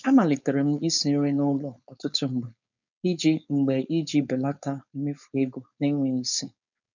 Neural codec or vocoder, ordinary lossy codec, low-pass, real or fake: codec, 16 kHz, 4.8 kbps, FACodec; none; 7.2 kHz; fake